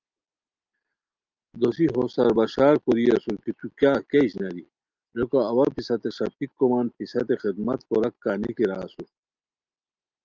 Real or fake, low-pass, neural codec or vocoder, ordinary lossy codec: real; 7.2 kHz; none; Opus, 24 kbps